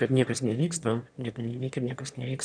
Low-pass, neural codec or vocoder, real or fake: 9.9 kHz; autoencoder, 22.05 kHz, a latent of 192 numbers a frame, VITS, trained on one speaker; fake